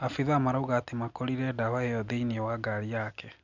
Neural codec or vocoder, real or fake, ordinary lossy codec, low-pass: none; real; none; 7.2 kHz